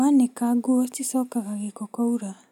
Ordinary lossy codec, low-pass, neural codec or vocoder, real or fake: none; 19.8 kHz; none; real